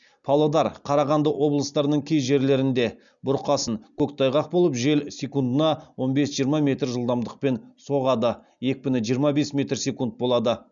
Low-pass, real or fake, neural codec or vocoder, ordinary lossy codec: 7.2 kHz; real; none; none